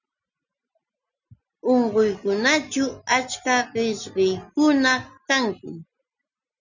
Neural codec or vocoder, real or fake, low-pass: none; real; 7.2 kHz